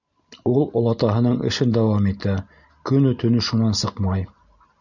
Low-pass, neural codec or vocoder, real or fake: 7.2 kHz; none; real